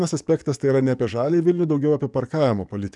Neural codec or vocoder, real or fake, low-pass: codec, 44.1 kHz, 7.8 kbps, DAC; fake; 10.8 kHz